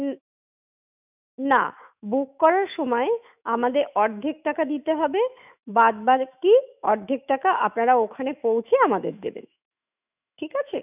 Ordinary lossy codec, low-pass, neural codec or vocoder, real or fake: none; 3.6 kHz; autoencoder, 48 kHz, 128 numbers a frame, DAC-VAE, trained on Japanese speech; fake